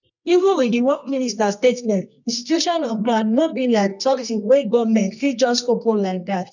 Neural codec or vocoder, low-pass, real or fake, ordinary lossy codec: codec, 24 kHz, 0.9 kbps, WavTokenizer, medium music audio release; 7.2 kHz; fake; none